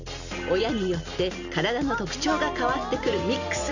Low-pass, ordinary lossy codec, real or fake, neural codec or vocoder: 7.2 kHz; none; real; none